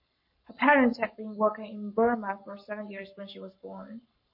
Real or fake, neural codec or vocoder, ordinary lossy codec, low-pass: fake; codec, 44.1 kHz, 7.8 kbps, Pupu-Codec; MP3, 32 kbps; 5.4 kHz